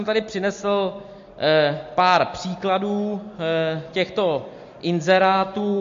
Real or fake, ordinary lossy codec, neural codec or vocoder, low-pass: real; MP3, 48 kbps; none; 7.2 kHz